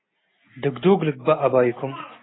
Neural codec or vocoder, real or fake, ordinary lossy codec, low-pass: none; real; AAC, 16 kbps; 7.2 kHz